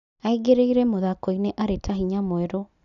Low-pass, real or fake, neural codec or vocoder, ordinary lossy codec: 7.2 kHz; real; none; none